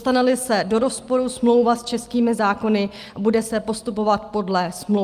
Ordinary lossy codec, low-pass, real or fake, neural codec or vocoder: Opus, 32 kbps; 14.4 kHz; real; none